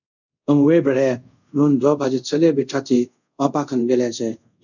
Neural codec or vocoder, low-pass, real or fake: codec, 24 kHz, 0.5 kbps, DualCodec; 7.2 kHz; fake